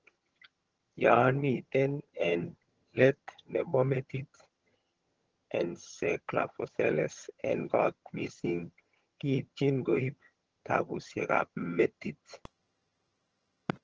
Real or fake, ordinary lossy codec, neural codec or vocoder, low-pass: fake; Opus, 16 kbps; vocoder, 22.05 kHz, 80 mel bands, HiFi-GAN; 7.2 kHz